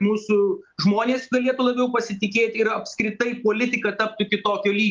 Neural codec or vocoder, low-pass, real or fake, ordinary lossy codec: none; 7.2 kHz; real; Opus, 24 kbps